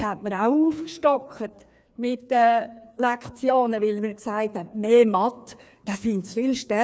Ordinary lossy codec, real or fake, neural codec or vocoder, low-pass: none; fake; codec, 16 kHz, 2 kbps, FreqCodec, larger model; none